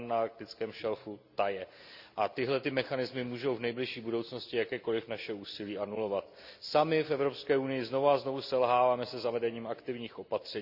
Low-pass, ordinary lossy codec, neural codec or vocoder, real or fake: 5.4 kHz; none; none; real